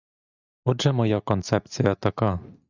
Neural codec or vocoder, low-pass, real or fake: none; 7.2 kHz; real